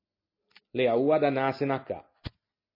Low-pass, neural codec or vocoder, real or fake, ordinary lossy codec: 5.4 kHz; none; real; MP3, 24 kbps